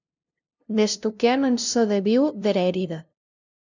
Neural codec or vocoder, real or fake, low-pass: codec, 16 kHz, 0.5 kbps, FunCodec, trained on LibriTTS, 25 frames a second; fake; 7.2 kHz